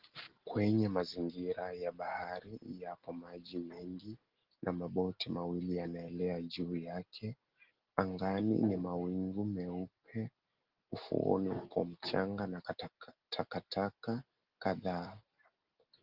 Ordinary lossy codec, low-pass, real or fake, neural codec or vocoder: Opus, 16 kbps; 5.4 kHz; real; none